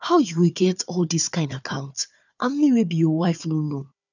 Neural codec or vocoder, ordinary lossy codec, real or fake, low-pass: codec, 16 kHz, 4 kbps, FunCodec, trained on Chinese and English, 50 frames a second; none; fake; 7.2 kHz